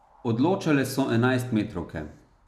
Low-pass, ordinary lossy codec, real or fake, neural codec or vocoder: 14.4 kHz; none; real; none